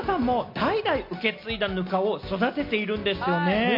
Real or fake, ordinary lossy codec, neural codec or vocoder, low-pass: real; MP3, 32 kbps; none; 5.4 kHz